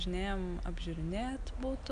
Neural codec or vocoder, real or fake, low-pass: none; real; 9.9 kHz